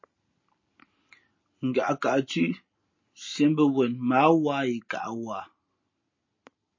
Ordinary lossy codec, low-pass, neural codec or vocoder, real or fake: MP3, 32 kbps; 7.2 kHz; none; real